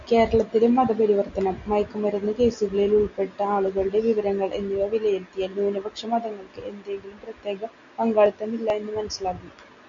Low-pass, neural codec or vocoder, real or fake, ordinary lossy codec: 7.2 kHz; none; real; AAC, 64 kbps